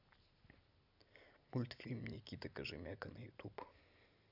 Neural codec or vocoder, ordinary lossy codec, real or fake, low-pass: none; none; real; 5.4 kHz